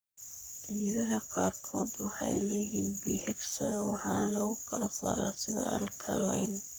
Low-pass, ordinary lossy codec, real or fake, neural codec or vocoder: none; none; fake; codec, 44.1 kHz, 3.4 kbps, Pupu-Codec